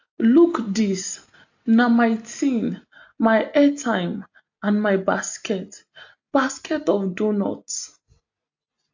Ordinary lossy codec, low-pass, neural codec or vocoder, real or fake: AAC, 48 kbps; 7.2 kHz; none; real